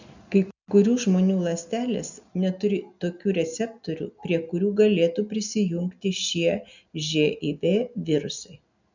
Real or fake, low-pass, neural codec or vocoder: real; 7.2 kHz; none